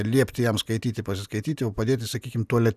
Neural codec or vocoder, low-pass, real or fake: none; 14.4 kHz; real